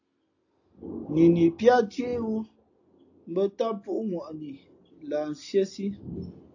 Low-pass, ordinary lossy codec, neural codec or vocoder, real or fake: 7.2 kHz; AAC, 48 kbps; none; real